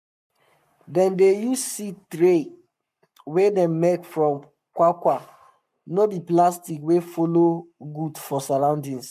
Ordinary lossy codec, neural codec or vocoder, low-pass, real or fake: MP3, 96 kbps; codec, 44.1 kHz, 7.8 kbps, Pupu-Codec; 14.4 kHz; fake